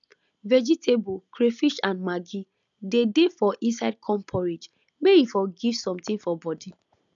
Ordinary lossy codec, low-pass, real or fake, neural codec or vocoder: none; 7.2 kHz; real; none